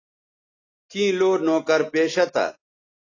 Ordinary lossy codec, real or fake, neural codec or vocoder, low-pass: AAC, 32 kbps; real; none; 7.2 kHz